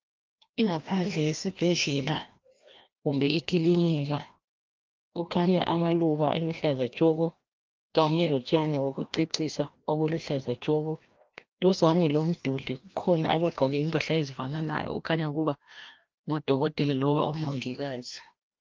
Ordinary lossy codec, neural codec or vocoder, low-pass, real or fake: Opus, 24 kbps; codec, 16 kHz, 1 kbps, FreqCodec, larger model; 7.2 kHz; fake